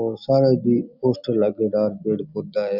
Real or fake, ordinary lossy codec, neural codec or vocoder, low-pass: real; none; none; 5.4 kHz